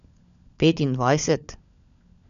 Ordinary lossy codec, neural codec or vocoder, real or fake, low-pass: none; codec, 16 kHz, 16 kbps, FunCodec, trained on LibriTTS, 50 frames a second; fake; 7.2 kHz